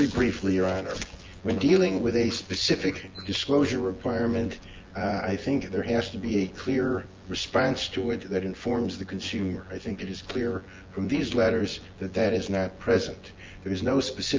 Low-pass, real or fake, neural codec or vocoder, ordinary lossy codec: 7.2 kHz; fake; vocoder, 24 kHz, 100 mel bands, Vocos; Opus, 32 kbps